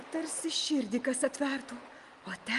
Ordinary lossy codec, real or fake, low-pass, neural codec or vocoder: Opus, 32 kbps; real; 10.8 kHz; none